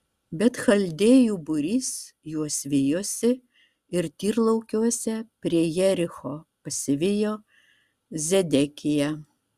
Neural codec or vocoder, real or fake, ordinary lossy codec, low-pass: none; real; Opus, 64 kbps; 14.4 kHz